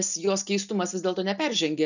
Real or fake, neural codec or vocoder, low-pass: real; none; 7.2 kHz